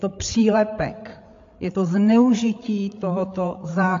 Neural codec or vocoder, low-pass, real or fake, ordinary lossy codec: codec, 16 kHz, 8 kbps, FreqCodec, larger model; 7.2 kHz; fake; AAC, 48 kbps